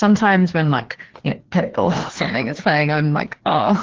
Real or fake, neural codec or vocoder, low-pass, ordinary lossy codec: fake; codec, 16 kHz, 2 kbps, FreqCodec, larger model; 7.2 kHz; Opus, 16 kbps